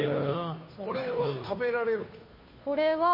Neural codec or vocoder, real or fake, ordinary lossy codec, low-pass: codec, 16 kHz, 2 kbps, FunCodec, trained on Chinese and English, 25 frames a second; fake; MP3, 24 kbps; 5.4 kHz